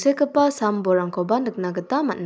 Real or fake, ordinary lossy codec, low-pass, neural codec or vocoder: real; none; none; none